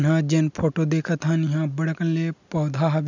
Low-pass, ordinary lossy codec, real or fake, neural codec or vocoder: 7.2 kHz; none; real; none